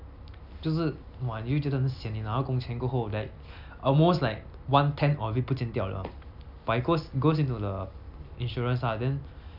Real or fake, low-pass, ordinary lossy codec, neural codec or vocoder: real; 5.4 kHz; none; none